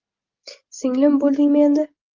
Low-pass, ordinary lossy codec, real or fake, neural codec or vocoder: 7.2 kHz; Opus, 32 kbps; real; none